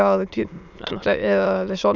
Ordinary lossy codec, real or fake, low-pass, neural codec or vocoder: none; fake; 7.2 kHz; autoencoder, 22.05 kHz, a latent of 192 numbers a frame, VITS, trained on many speakers